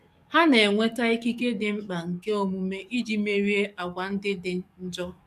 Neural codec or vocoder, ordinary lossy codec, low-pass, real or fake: codec, 44.1 kHz, 7.8 kbps, DAC; none; 14.4 kHz; fake